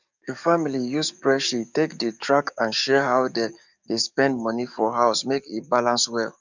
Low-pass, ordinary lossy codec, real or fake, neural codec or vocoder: 7.2 kHz; none; fake; codec, 44.1 kHz, 7.8 kbps, DAC